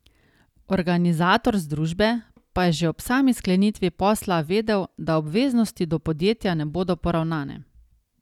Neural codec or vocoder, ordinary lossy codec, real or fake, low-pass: none; none; real; 19.8 kHz